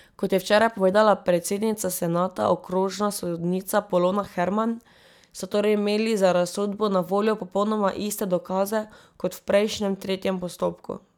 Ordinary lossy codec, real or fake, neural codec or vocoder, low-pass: none; real; none; 19.8 kHz